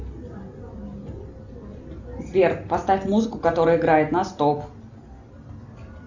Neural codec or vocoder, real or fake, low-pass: none; real; 7.2 kHz